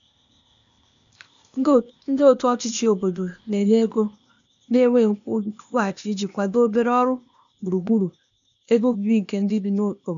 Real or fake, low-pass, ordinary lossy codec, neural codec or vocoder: fake; 7.2 kHz; AAC, 96 kbps; codec, 16 kHz, 0.8 kbps, ZipCodec